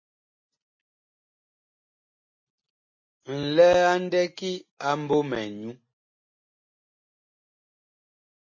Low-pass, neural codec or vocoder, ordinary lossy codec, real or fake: 7.2 kHz; none; MP3, 32 kbps; real